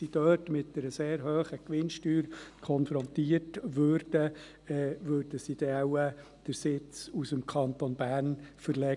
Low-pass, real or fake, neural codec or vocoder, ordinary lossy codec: 10.8 kHz; real; none; none